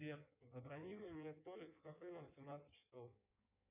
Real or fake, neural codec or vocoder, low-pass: fake; codec, 16 kHz in and 24 kHz out, 1.1 kbps, FireRedTTS-2 codec; 3.6 kHz